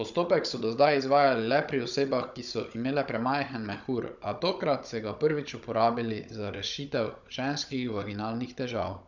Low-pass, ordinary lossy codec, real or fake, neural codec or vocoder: 7.2 kHz; none; fake; codec, 16 kHz, 16 kbps, FunCodec, trained on Chinese and English, 50 frames a second